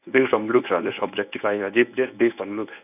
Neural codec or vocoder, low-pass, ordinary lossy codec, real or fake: codec, 24 kHz, 0.9 kbps, WavTokenizer, medium speech release version 1; 3.6 kHz; none; fake